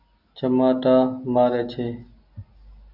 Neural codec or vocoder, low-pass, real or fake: none; 5.4 kHz; real